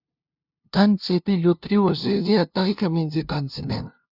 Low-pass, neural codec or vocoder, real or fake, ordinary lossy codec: 5.4 kHz; codec, 16 kHz, 0.5 kbps, FunCodec, trained on LibriTTS, 25 frames a second; fake; Opus, 64 kbps